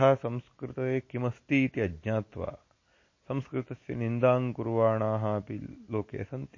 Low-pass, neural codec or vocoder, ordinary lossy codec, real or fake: 7.2 kHz; none; MP3, 32 kbps; real